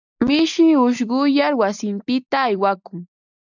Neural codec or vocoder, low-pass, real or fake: none; 7.2 kHz; real